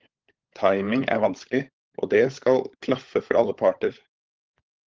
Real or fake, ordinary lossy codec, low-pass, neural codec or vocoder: fake; Opus, 16 kbps; 7.2 kHz; codec, 16 kHz, 16 kbps, FunCodec, trained on LibriTTS, 50 frames a second